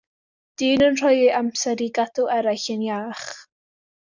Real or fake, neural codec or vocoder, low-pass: real; none; 7.2 kHz